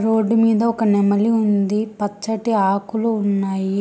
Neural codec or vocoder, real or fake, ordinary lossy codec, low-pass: none; real; none; none